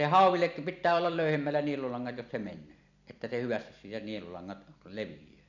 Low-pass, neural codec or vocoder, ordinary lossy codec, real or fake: 7.2 kHz; vocoder, 44.1 kHz, 128 mel bands every 256 samples, BigVGAN v2; none; fake